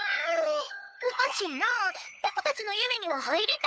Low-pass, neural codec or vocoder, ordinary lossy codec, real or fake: none; codec, 16 kHz, 4 kbps, FunCodec, trained on LibriTTS, 50 frames a second; none; fake